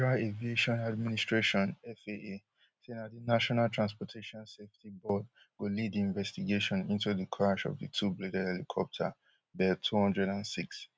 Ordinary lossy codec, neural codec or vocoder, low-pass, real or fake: none; none; none; real